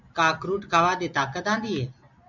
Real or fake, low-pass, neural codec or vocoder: real; 7.2 kHz; none